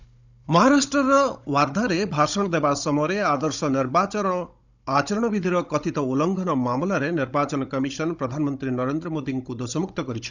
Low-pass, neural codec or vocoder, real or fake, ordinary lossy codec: 7.2 kHz; codec, 16 kHz, 16 kbps, FunCodec, trained on Chinese and English, 50 frames a second; fake; none